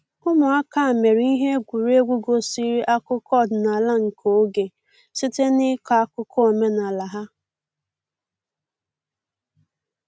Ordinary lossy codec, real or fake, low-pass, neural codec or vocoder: none; real; none; none